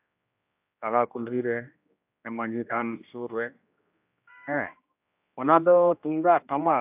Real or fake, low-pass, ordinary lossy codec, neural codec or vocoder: fake; 3.6 kHz; AAC, 32 kbps; codec, 16 kHz, 1 kbps, X-Codec, HuBERT features, trained on general audio